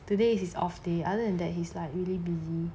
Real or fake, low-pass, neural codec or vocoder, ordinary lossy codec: real; none; none; none